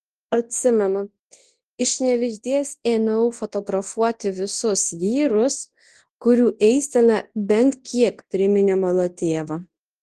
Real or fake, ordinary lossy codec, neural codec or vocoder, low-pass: fake; Opus, 16 kbps; codec, 24 kHz, 0.9 kbps, WavTokenizer, large speech release; 10.8 kHz